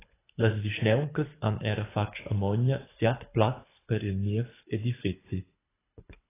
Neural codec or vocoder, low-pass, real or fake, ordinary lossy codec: codec, 24 kHz, 6 kbps, HILCodec; 3.6 kHz; fake; AAC, 16 kbps